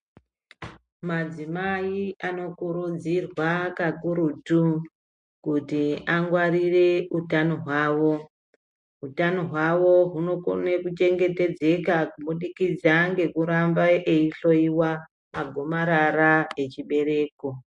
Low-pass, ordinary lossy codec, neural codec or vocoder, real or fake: 10.8 kHz; MP3, 48 kbps; none; real